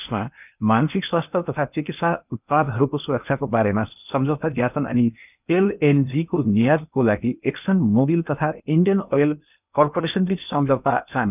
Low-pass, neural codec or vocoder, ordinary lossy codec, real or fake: 3.6 kHz; codec, 16 kHz in and 24 kHz out, 0.8 kbps, FocalCodec, streaming, 65536 codes; none; fake